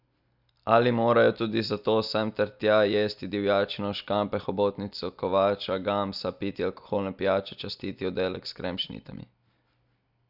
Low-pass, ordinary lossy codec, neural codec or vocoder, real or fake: 5.4 kHz; none; none; real